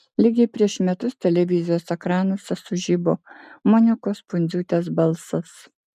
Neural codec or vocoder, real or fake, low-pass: none; real; 14.4 kHz